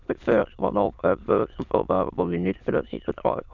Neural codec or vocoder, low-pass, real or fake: autoencoder, 22.05 kHz, a latent of 192 numbers a frame, VITS, trained on many speakers; 7.2 kHz; fake